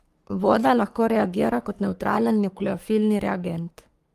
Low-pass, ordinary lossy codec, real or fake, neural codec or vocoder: 14.4 kHz; Opus, 32 kbps; fake; codec, 44.1 kHz, 3.4 kbps, Pupu-Codec